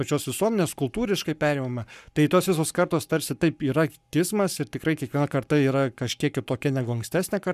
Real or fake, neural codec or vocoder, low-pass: fake; codec, 44.1 kHz, 7.8 kbps, Pupu-Codec; 14.4 kHz